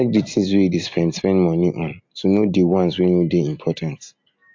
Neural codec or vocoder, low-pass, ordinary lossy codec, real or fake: none; 7.2 kHz; MP3, 48 kbps; real